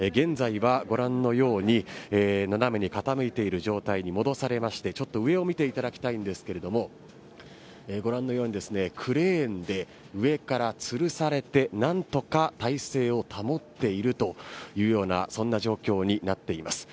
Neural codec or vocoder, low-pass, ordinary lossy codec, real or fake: none; none; none; real